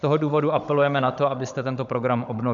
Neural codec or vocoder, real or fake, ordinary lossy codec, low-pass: codec, 16 kHz, 16 kbps, FunCodec, trained on Chinese and English, 50 frames a second; fake; MP3, 96 kbps; 7.2 kHz